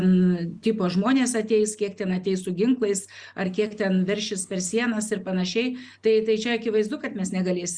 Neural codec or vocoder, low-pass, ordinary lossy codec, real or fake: none; 9.9 kHz; Opus, 64 kbps; real